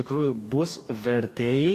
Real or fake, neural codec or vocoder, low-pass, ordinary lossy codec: fake; codec, 44.1 kHz, 2.6 kbps, DAC; 14.4 kHz; AAC, 64 kbps